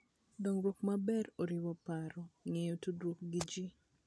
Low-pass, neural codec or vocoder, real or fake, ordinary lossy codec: none; none; real; none